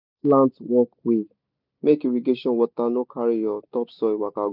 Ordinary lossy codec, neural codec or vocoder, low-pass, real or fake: none; none; 5.4 kHz; real